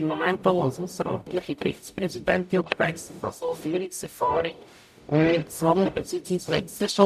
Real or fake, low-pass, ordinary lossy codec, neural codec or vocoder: fake; 14.4 kHz; none; codec, 44.1 kHz, 0.9 kbps, DAC